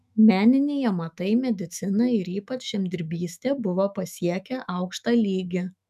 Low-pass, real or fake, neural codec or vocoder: 14.4 kHz; fake; autoencoder, 48 kHz, 128 numbers a frame, DAC-VAE, trained on Japanese speech